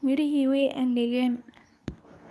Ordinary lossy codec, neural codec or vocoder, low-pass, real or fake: none; codec, 24 kHz, 0.9 kbps, WavTokenizer, medium speech release version 2; none; fake